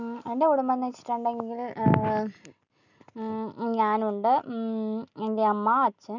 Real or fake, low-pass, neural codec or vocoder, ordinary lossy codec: real; 7.2 kHz; none; none